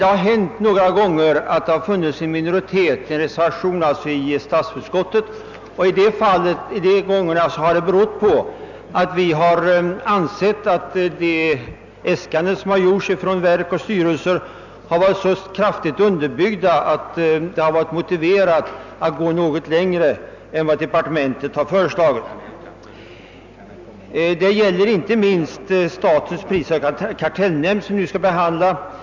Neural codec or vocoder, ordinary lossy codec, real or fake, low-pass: none; none; real; 7.2 kHz